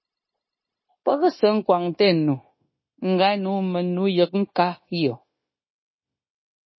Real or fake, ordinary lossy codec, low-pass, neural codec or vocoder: fake; MP3, 24 kbps; 7.2 kHz; codec, 16 kHz, 0.9 kbps, LongCat-Audio-Codec